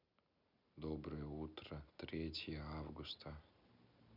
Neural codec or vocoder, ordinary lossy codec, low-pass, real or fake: none; none; 5.4 kHz; real